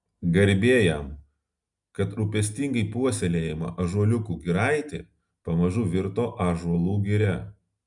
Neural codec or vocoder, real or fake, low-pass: none; real; 10.8 kHz